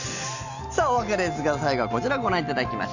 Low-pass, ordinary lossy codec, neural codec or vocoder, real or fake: 7.2 kHz; none; none; real